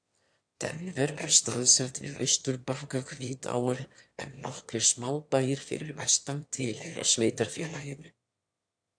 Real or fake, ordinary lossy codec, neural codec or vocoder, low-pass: fake; AAC, 64 kbps; autoencoder, 22.05 kHz, a latent of 192 numbers a frame, VITS, trained on one speaker; 9.9 kHz